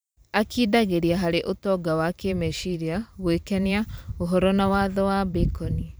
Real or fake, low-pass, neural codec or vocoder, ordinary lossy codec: fake; none; vocoder, 44.1 kHz, 128 mel bands every 512 samples, BigVGAN v2; none